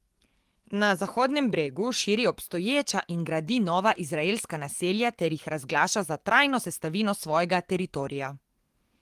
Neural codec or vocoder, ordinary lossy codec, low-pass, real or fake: codec, 44.1 kHz, 7.8 kbps, DAC; Opus, 24 kbps; 14.4 kHz; fake